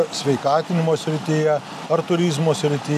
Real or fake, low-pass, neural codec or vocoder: real; 14.4 kHz; none